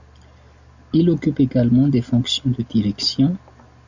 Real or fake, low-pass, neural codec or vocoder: real; 7.2 kHz; none